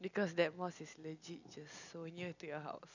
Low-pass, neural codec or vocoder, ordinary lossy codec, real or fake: 7.2 kHz; none; none; real